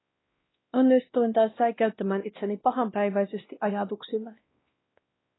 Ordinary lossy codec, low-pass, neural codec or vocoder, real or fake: AAC, 16 kbps; 7.2 kHz; codec, 16 kHz, 1 kbps, X-Codec, WavLM features, trained on Multilingual LibriSpeech; fake